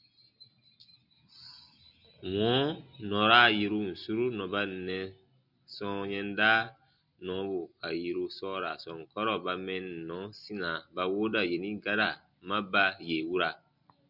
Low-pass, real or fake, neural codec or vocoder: 5.4 kHz; real; none